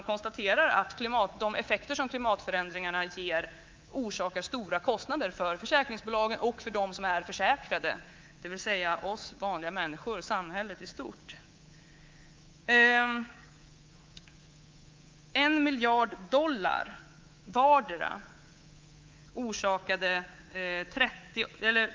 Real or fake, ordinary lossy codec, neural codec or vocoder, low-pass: fake; Opus, 24 kbps; codec, 24 kHz, 3.1 kbps, DualCodec; 7.2 kHz